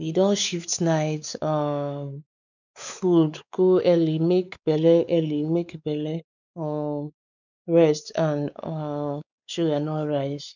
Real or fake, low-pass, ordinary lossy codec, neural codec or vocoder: fake; 7.2 kHz; none; codec, 16 kHz, 4 kbps, X-Codec, WavLM features, trained on Multilingual LibriSpeech